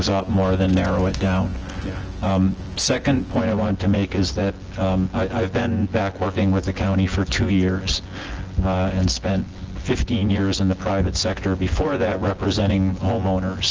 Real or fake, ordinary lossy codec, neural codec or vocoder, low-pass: fake; Opus, 16 kbps; vocoder, 24 kHz, 100 mel bands, Vocos; 7.2 kHz